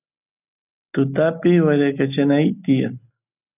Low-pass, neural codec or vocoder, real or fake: 3.6 kHz; none; real